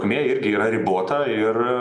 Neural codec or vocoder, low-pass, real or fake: none; 9.9 kHz; real